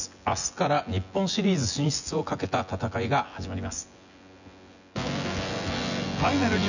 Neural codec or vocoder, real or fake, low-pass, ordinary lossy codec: vocoder, 24 kHz, 100 mel bands, Vocos; fake; 7.2 kHz; none